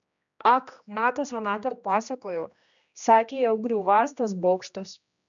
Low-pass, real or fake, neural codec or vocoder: 7.2 kHz; fake; codec, 16 kHz, 1 kbps, X-Codec, HuBERT features, trained on general audio